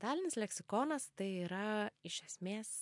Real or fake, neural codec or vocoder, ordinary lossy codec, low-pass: real; none; MP3, 64 kbps; 10.8 kHz